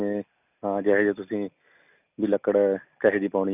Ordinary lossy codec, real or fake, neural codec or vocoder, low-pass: none; real; none; 3.6 kHz